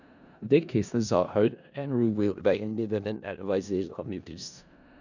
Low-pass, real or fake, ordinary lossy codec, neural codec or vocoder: 7.2 kHz; fake; none; codec, 16 kHz in and 24 kHz out, 0.4 kbps, LongCat-Audio-Codec, four codebook decoder